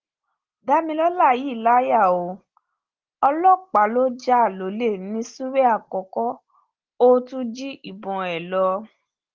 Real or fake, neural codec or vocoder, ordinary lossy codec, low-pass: real; none; Opus, 16 kbps; 7.2 kHz